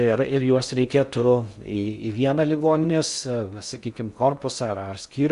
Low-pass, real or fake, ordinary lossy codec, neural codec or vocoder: 10.8 kHz; fake; Opus, 64 kbps; codec, 16 kHz in and 24 kHz out, 0.6 kbps, FocalCodec, streaming, 4096 codes